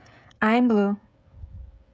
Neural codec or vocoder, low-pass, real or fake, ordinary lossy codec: codec, 16 kHz, 16 kbps, FreqCodec, smaller model; none; fake; none